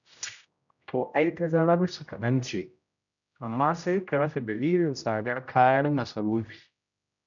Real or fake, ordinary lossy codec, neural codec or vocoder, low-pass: fake; none; codec, 16 kHz, 0.5 kbps, X-Codec, HuBERT features, trained on general audio; 7.2 kHz